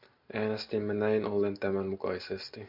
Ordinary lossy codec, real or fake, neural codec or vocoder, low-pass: MP3, 48 kbps; real; none; 5.4 kHz